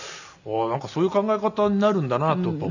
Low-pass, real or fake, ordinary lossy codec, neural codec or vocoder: 7.2 kHz; real; none; none